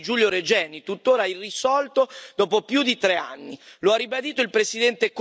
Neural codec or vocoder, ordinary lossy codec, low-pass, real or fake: none; none; none; real